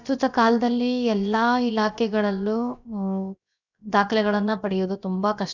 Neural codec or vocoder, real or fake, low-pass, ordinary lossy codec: codec, 16 kHz, 0.7 kbps, FocalCodec; fake; 7.2 kHz; none